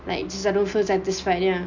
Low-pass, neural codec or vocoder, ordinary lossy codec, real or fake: 7.2 kHz; none; none; real